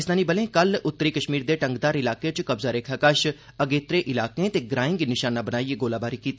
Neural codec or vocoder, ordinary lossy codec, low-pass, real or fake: none; none; none; real